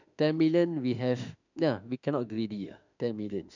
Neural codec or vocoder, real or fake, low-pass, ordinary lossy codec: autoencoder, 48 kHz, 32 numbers a frame, DAC-VAE, trained on Japanese speech; fake; 7.2 kHz; none